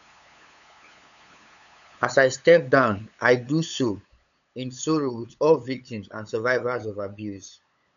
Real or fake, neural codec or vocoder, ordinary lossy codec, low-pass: fake; codec, 16 kHz, 8 kbps, FunCodec, trained on LibriTTS, 25 frames a second; none; 7.2 kHz